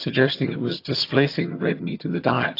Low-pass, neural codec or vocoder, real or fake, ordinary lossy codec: 5.4 kHz; vocoder, 22.05 kHz, 80 mel bands, HiFi-GAN; fake; AAC, 32 kbps